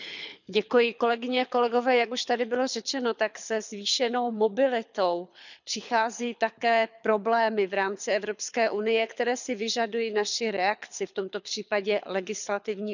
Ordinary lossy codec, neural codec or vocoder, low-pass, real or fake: none; codec, 24 kHz, 6 kbps, HILCodec; 7.2 kHz; fake